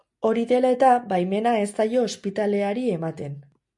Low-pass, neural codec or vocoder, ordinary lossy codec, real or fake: 10.8 kHz; none; MP3, 64 kbps; real